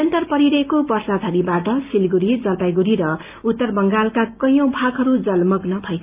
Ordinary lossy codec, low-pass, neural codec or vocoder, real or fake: Opus, 24 kbps; 3.6 kHz; none; real